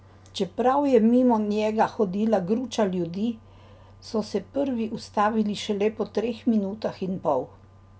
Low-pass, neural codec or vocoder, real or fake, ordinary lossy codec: none; none; real; none